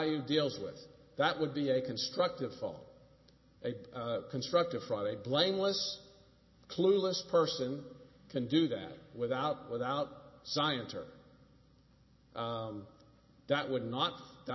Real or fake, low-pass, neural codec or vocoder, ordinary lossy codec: real; 7.2 kHz; none; MP3, 24 kbps